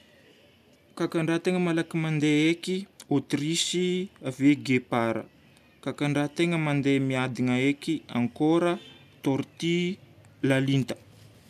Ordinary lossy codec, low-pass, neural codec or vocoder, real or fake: none; 14.4 kHz; none; real